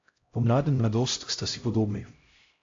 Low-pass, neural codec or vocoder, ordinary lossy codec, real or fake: 7.2 kHz; codec, 16 kHz, 0.5 kbps, X-Codec, HuBERT features, trained on LibriSpeech; AAC, 48 kbps; fake